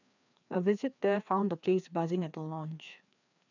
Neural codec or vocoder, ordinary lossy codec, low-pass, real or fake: codec, 16 kHz, 2 kbps, FreqCodec, larger model; none; 7.2 kHz; fake